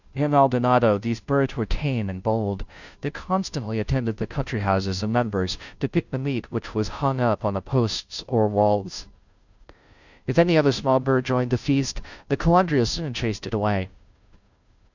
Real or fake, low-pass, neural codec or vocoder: fake; 7.2 kHz; codec, 16 kHz, 0.5 kbps, FunCodec, trained on Chinese and English, 25 frames a second